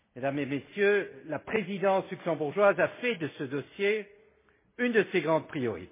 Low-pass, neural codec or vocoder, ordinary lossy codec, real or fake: 3.6 kHz; none; MP3, 16 kbps; real